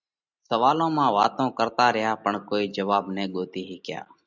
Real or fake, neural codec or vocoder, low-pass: real; none; 7.2 kHz